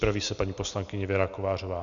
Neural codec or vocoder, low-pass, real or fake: none; 7.2 kHz; real